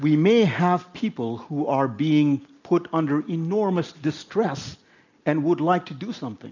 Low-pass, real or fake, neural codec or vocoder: 7.2 kHz; real; none